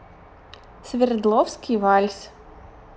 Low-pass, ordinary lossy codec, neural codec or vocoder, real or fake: none; none; none; real